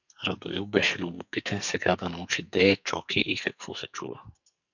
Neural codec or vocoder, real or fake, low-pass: codec, 44.1 kHz, 2.6 kbps, SNAC; fake; 7.2 kHz